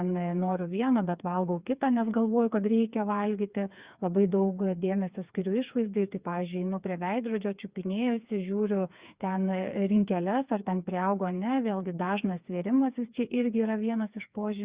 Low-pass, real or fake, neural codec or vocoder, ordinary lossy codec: 3.6 kHz; fake; codec, 16 kHz, 4 kbps, FreqCodec, smaller model; Opus, 64 kbps